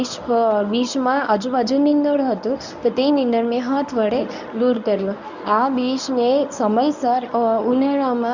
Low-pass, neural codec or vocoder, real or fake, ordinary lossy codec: 7.2 kHz; codec, 24 kHz, 0.9 kbps, WavTokenizer, medium speech release version 1; fake; none